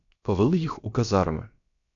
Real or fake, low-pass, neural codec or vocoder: fake; 7.2 kHz; codec, 16 kHz, about 1 kbps, DyCAST, with the encoder's durations